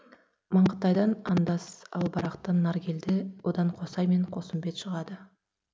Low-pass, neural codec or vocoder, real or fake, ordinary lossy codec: none; none; real; none